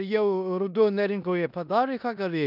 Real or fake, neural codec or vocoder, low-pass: fake; codec, 16 kHz in and 24 kHz out, 0.9 kbps, LongCat-Audio-Codec, four codebook decoder; 5.4 kHz